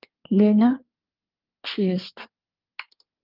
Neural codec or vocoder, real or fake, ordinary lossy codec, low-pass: codec, 24 kHz, 1 kbps, SNAC; fake; Opus, 32 kbps; 5.4 kHz